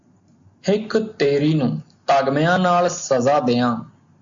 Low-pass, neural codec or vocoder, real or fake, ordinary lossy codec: 7.2 kHz; none; real; AAC, 64 kbps